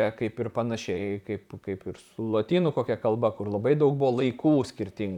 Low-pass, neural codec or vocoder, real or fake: 19.8 kHz; vocoder, 44.1 kHz, 128 mel bands, Pupu-Vocoder; fake